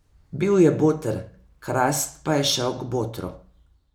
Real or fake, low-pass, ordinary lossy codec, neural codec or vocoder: real; none; none; none